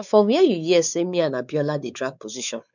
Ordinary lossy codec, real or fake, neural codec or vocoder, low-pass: none; fake; codec, 16 kHz, 4 kbps, X-Codec, WavLM features, trained on Multilingual LibriSpeech; 7.2 kHz